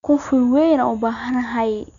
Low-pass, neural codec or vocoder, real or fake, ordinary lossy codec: 7.2 kHz; none; real; none